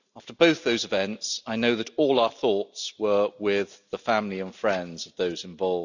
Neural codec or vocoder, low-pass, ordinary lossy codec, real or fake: none; 7.2 kHz; none; real